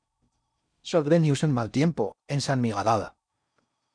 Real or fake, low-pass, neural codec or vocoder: fake; 9.9 kHz; codec, 16 kHz in and 24 kHz out, 0.6 kbps, FocalCodec, streaming, 4096 codes